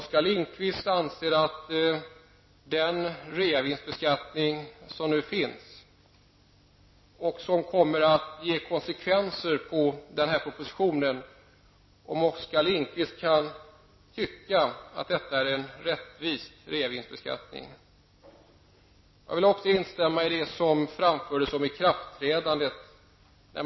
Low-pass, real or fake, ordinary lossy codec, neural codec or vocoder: 7.2 kHz; real; MP3, 24 kbps; none